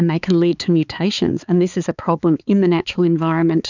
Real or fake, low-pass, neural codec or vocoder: fake; 7.2 kHz; codec, 16 kHz, 4 kbps, X-Codec, HuBERT features, trained on balanced general audio